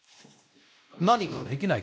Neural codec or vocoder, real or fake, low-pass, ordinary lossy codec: codec, 16 kHz, 1 kbps, X-Codec, WavLM features, trained on Multilingual LibriSpeech; fake; none; none